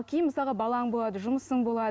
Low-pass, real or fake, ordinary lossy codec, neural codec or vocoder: none; real; none; none